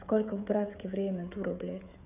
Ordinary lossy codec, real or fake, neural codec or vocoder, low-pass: none; fake; codec, 16 kHz, 16 kbps, FreqCodec, smaller model; 3.6 kHz